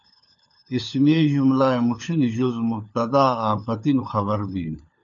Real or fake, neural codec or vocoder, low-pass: fake; codec, 16 kHz, 4 kbps, FunCodec, trained on LibriTTS, 50 frames a second; 7.2 kHz